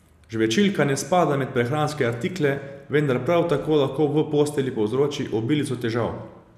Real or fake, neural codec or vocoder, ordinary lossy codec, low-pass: real; none; none; 14.4 kHz